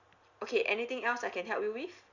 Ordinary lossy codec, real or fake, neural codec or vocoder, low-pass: Opus, 64 kbps; real; none; 7.2 kHz